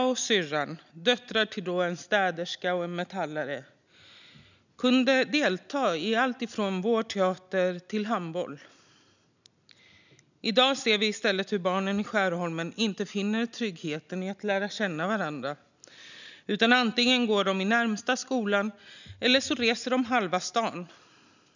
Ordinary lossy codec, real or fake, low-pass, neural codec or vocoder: none; real; 7.2 kHz; none